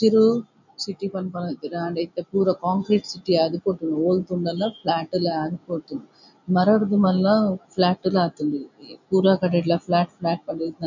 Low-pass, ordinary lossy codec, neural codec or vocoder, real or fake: 7.2 kHz; none; none; real